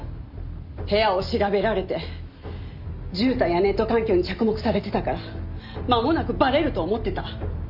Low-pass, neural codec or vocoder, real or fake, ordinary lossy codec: 5.4 kHz; none; real; none